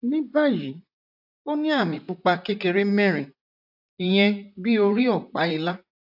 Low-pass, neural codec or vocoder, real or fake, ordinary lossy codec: 5.4 kHz; vocoder, 44.1 kHz, 128 mel bands, Pupu-Vocoder; fake; AAC, 48 kbps